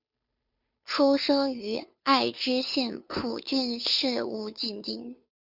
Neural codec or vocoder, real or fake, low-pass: codec, 16 kHz, 2 kbps, FunCodec, trained on Chinese and English, 25 frames a second; fake; 5.4 kHz